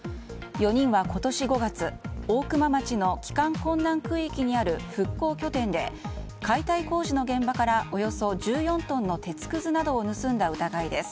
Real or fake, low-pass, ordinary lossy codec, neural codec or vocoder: real; none; none; none